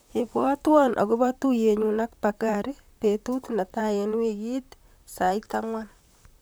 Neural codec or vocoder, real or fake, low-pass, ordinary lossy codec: vocoder, 44.1 kHz, 128 mel bands, Pupu-Vocoder; fake; none; none